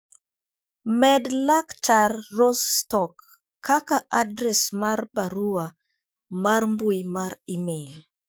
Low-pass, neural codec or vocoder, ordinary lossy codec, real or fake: none; codec, 44.1 kHz, 7.8 kbps, DAC; none; fake